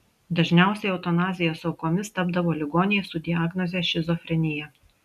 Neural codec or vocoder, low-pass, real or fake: none; 14.4 kHz; real